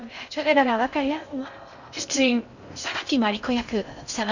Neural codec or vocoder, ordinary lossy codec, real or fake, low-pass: codec, 16 kHz in and 24 kHz out, 0.6 kbps, FocalCodec, streaming, 2048 codes; none; fake; 7.2 kHz